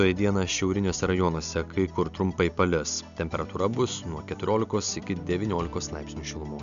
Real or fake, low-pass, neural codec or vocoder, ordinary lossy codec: real; 7.2 kHz; none; AAC, 96 kbps